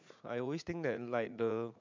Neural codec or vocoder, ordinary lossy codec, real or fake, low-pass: vocoder, 44.1 kHz, 80 mel bands, Vocos; MP3, 64 kbps; fake; 7.2 kHz